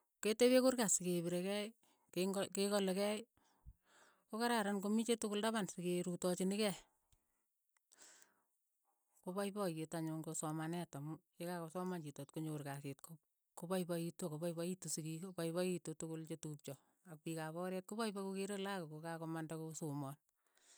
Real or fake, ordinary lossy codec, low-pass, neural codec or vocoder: real; none; none; none